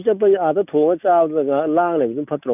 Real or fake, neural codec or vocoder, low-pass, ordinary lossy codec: real; none; 3.6 kHz; none